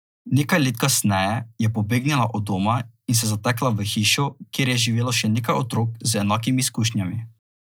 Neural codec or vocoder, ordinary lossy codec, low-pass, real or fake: none; none; none; real